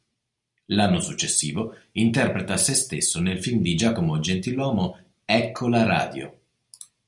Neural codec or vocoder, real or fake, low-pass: none; real; 10.8 kHz